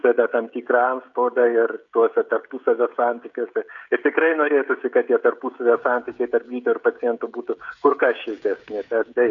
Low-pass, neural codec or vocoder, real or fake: 7.2 kHz; codec, 16 kHz, 16 kbps, FreqCodec, smaller model; fake